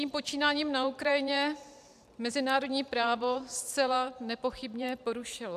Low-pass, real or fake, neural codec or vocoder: 14.4 kHz; fake; vocoder, 44.1 kHz, 128 mel bands every 512 samples, BigVGAN v2